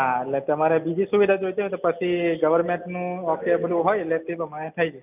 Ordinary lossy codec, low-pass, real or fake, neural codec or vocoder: none; 3.6 kHz; real; none